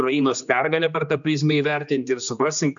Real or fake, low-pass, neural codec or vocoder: fake; 7.2 kHz; codec, 16 kHz, 2 kbps, X-Codec, HuBERT features, trained on general audio